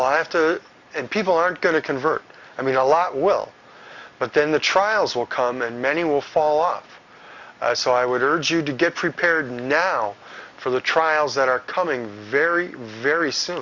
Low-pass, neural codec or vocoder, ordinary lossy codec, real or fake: 7.2 kHz; none; Opus, 64 kbps; real